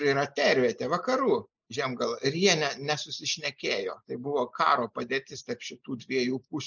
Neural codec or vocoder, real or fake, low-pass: none; real; 7.2 kHz